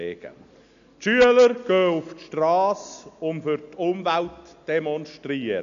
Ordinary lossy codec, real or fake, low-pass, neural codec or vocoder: AAC, 96 kbps; real; 7.2 kHz; none